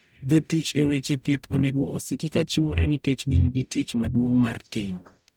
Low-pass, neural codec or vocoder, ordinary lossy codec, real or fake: none; codec, 44.1 kHz, 0.9 kbps, DAC; none; fake